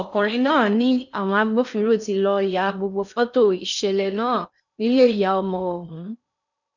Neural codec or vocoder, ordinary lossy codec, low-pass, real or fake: codec, 16 kHz in and 24 kHz out, 0.6 kbps, FocalCodec, streaming, 4096 codes; none; 7.2 kHz; fake